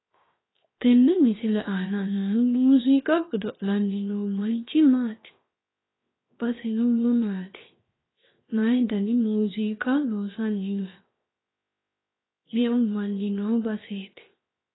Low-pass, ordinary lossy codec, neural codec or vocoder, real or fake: 7.2 kHz; AAC, 16 kbps; codec, 16 kHz, 0.7 kbps, FocalCodec; fake